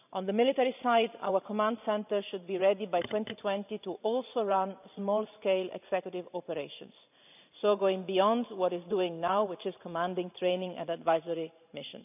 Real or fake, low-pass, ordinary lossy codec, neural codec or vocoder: fake; 3.6 kHz; none; vocoder, 44.1 kHz, 128 mel bands every 512 samples, BigVGAN v2